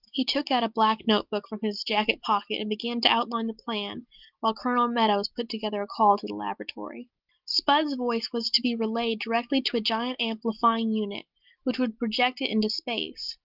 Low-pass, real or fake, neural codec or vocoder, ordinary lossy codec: 5.4 kHz; real; none; Opus, 24 kbps